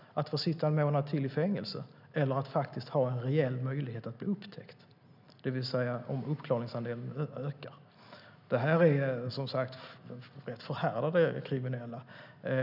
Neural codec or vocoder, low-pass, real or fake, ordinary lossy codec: none; 5.4 kHz; real; none